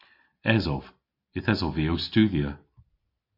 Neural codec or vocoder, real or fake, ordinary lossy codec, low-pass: none; real; MP3, 32 kbps; 5.4 kHz